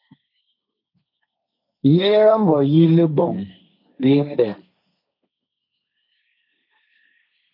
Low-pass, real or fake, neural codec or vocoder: 5.4 kHz; fake; codec, 16 kHz, 1.1 kbps, Voila-Tokenizer